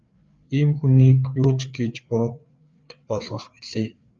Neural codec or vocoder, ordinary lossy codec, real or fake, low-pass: codec, 16 kHz, 4 kbps, FreqCodec, larger model; Opus, 32 kbps; fake; 7.2 kHz